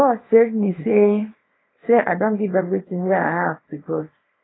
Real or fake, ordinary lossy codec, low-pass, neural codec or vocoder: fake; AAC, 16 kbps; 7.2 kHz; codec, 24 kHz, 0.9 kbps, WavTokenizer, small release